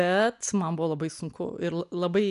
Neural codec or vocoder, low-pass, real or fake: none; 10.8 kHz; real